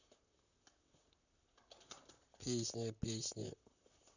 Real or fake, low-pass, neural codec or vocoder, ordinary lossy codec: fake; 7.2 kHz; vocoder, 44.1 kHz, 128 mel bands, Pupu-Vocoder; none